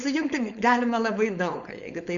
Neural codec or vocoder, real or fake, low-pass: codec, 16 kHz, 4.8 kbps, FACodec; fake; 7.2 kHz